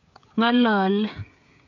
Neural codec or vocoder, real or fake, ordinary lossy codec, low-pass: codec, 16 kHz, 16 kbps, FreqCodec, smaller model; fake; none; 7.2 kHz